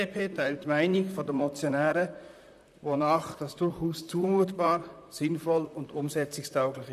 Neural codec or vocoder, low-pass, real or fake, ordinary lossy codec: vocoder, 44.1 kHz, 128 mel bands, Pupu-Vocoder; 14.4 kHz; fake; none